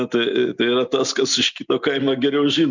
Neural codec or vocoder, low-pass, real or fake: none; 7.2 kHz; real